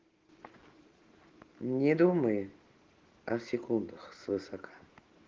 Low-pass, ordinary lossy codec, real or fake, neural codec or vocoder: 7.2 kHz; Opus, 16 kbps; real; none